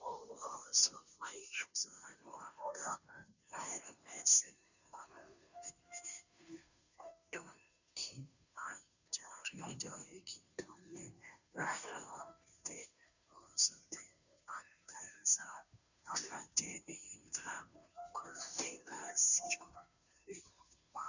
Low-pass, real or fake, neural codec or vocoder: 7.2 kHz; fake; codec, 16 kHz, 0.5 kbps, FunCodec, trained on Chinese and English, 25 frames a second